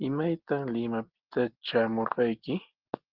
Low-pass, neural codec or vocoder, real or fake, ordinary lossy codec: 5.4 kHz; none; real; Opus, 16 kbps